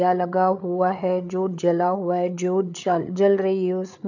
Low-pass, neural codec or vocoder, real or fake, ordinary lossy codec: 7.2 kHz; codec, 16 kHz, 8 kbps, FreqCodec, larger model; fake; none